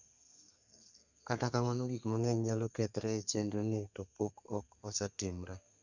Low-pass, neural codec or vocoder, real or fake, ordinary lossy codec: 7.2 kHz; codec, 32 kHz, 1.9 kbps, SNAC; fake; none